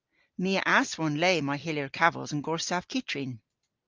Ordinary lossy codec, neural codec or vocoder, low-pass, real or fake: Opus, 32 kbps; none; 7.2 kHz; real